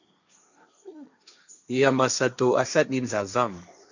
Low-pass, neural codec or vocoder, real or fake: 7.2 kHz; codec, 16 kHz, 1.1 kbps, Voila-Tokenizer; fake